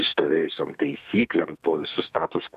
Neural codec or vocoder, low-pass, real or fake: codec, 32 kHz, 1.9 kbps, SNAC; 14.4 kHz; fake